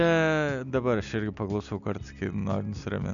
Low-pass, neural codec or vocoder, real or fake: 7.2 kHz; none; real